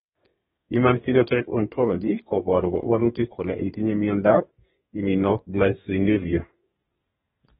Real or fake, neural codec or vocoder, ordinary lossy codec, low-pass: fake; codec, 32 kHz, 1.9 kbps, SNAC; AAC, 16 kbps; 14.4 kHz